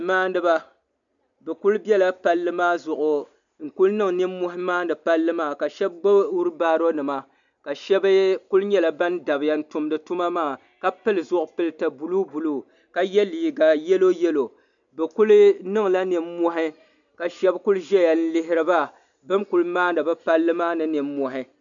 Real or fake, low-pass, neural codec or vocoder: real; 7.2 kHz; none